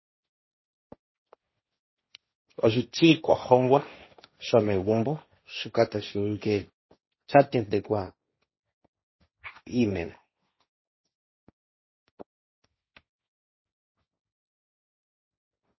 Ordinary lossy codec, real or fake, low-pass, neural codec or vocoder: MP3, 24 kbps; fake; 7.2 kHz; codec, 16 kHz, 1.1 kbps, Voila-Tokenizer